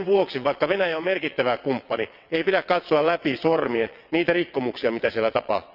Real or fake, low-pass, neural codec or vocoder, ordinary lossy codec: fake; 5.4 kHz; vocoder, 22.05 kHz, 80 mel bands, WaveNeXt; none